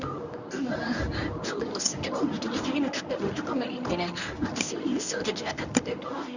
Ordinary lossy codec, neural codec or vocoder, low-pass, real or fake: none; codec, 24 kHz, 0.9 kbps, WavTokenizer, medium speech release version 1; 7.2 kHz; fake